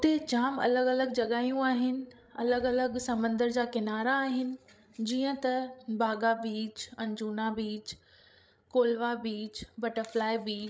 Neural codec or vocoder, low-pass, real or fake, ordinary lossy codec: codec, 16 kHz, 16 kbps, FreqCodec, larger model; none; fake; none